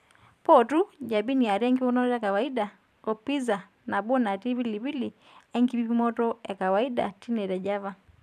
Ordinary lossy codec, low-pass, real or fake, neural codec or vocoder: none; 14.4 kHz; fake; vocoder, 44.1 kHz, 128 mel bands every 512 samples, BigVGAN v2